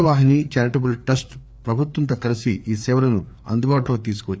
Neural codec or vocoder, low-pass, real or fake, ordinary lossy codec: codec, 16 kHz, 4 kbps, FreqCodec, larger model; none; fake; none